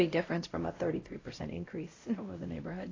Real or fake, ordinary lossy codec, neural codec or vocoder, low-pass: fake; AAC, 32 kbps; codec, 16 kHz, 0.5 kbps, X-Codec, WavLM features, trained on Multilingual LibriSpeech; 7.2 kHz